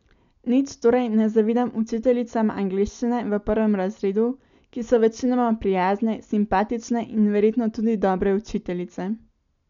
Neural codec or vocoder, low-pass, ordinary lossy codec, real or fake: none; 7.2 kHz; none; real